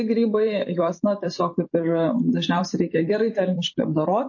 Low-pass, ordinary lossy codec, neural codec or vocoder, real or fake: 7.2 kHz; MP3, 32 kbps; vocoder, 44.1 kHz, 128 mel bands every 256 samples, BigVGAN v2; fake